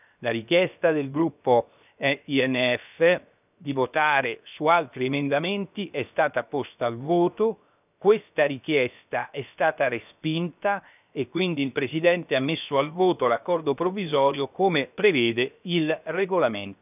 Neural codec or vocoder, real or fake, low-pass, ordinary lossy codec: codec, 16 kHz, 0.7 kbps, FocalCodec; fake; 3.6 kHz; none